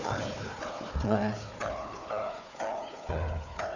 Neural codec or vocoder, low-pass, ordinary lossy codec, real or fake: codec, 16 kHz, 4 kbps, FunCodec, trained on Chinese and English, 50 frames a second; 7.2 kHz; none; fake